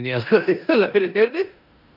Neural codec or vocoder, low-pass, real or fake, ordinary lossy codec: codec, 16 kHz in and 24 kHz out, 0.9 kbps, LongCat-Audio-Codec, four codebook decoder; 5.4 kHz; fake; none